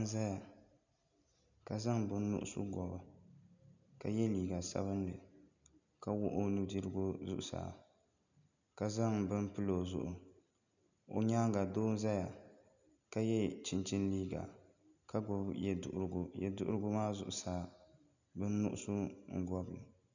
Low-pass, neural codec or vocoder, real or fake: 7.2 kHz; none; real